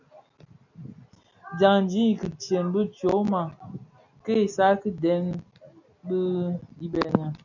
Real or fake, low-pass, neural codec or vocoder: real; 7.2 kHz; none